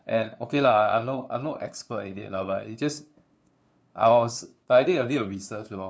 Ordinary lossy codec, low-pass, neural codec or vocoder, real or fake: none; none; codec, 16 kHz, 2 kbps, FunCodec, trained on LibriTTS, 25 frames a second; fake